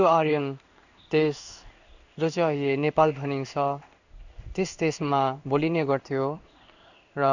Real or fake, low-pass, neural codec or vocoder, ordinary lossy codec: fake; 7.2 kHz; codec, 16 kHz in and 24 kHz out, 1 kbps, XY-Tokenizer; none